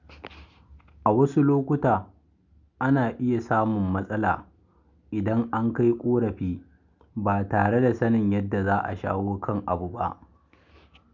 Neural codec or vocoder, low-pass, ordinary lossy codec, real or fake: none; 7.2 kHz; Opus, 64 kbps; real